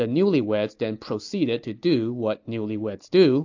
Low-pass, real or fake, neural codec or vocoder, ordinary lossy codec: 7.2 kHz; real; none; AAC, 48 kbps